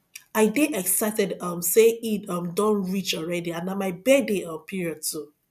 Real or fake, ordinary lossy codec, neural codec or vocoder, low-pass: real; none; none; 14.4 kHz